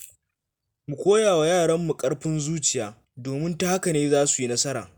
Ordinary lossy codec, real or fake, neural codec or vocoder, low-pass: none; real; none; none